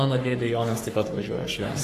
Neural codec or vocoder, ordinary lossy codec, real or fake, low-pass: codec, 44.1 kHz, 3.4 kbps, Pupu-Codec; AAC, 48 kbps; fake; 14.4 kHz